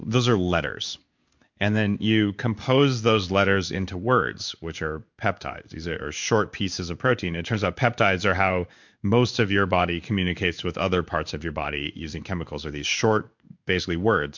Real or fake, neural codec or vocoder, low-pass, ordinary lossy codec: fake; codec, 16 kHz in and 24 kHz out, 1 kbps, XY-Tokenizer; 7.2 kHz; MP3, 64 kbps